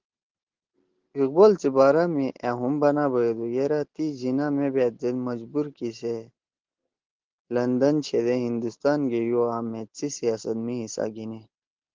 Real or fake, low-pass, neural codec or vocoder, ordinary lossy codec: real; 7.2 kHz; none; Opus, 16 kbps